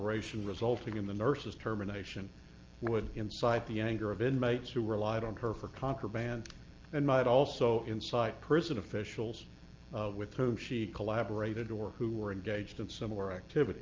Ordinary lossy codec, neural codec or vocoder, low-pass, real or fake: Opus, 24 kbps; none; 7.2 kHz; real